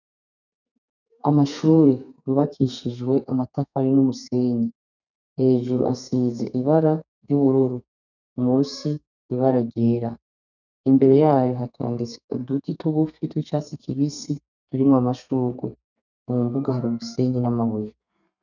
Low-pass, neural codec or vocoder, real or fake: 7.2 kHz; codec, 32 kHz, 1.9 kbps, SNAC; fake